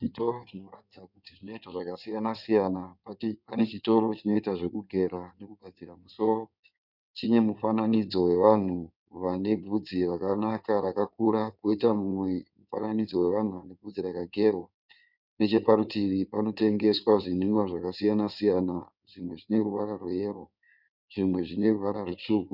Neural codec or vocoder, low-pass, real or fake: codec, 16 kHz in and 24 kHz out, 2.2 kbps, FireRedTTS-2 codec; 5.4 kHz; fake